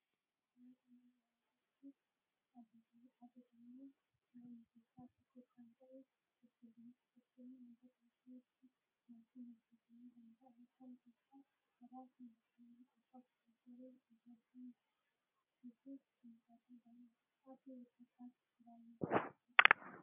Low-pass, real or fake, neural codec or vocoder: 3.6 kHz; real; none